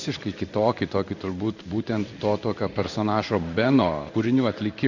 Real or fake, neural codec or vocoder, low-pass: fake; codec, 16 kHz in and 24 kHz out, 1 kbps, XY-Tokenizer; 7.2 kHz